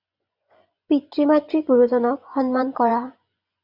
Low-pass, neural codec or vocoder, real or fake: 5.4 kHz; vocoder, 24 kHz, 100 mel bands, Vocos; fake